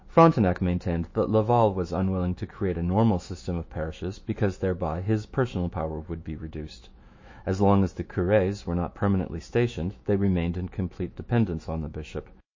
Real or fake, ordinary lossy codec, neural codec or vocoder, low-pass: fake; MP3, 32 kbps; autoencoder, 48 kHz, 128 numbers a frame, DAC-VAE, trained on Japanese speech; 7.2 kHz